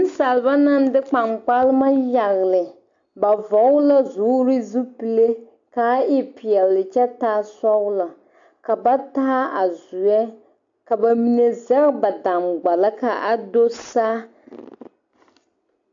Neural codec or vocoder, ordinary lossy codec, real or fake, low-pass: none; AAC, 64 kbps; real; 7.2 kHz